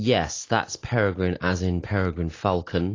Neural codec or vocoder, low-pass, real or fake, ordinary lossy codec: none; 7.2 kHz; real; AAC, 32 kbps